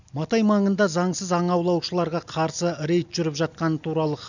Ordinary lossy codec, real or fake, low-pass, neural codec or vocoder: none; real; 7.2 kHz; none